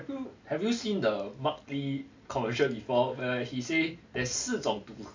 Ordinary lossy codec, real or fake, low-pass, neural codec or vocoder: MP3, 48 kbps; real; 7.2 kHz; none